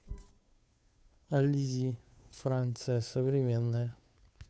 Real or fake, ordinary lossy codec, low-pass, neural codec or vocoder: fake; none; none; codec, 16 kHz, 2 kbps, FunCodec, trained on Chinese and English, 25 frames a second